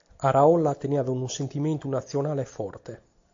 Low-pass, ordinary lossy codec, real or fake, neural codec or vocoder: 7.2 kHz; AAC, 48 kbps; real; none